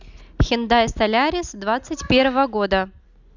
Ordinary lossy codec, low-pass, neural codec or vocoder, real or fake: none; 7.2 kHz; none; real